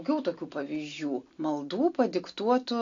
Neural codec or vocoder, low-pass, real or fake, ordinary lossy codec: none; 7.2 kHz; real; AAC, 48 kbps